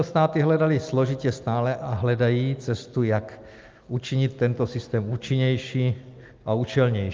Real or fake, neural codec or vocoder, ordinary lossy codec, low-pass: real; none; Opus, 24 kbps; 7.2 kHz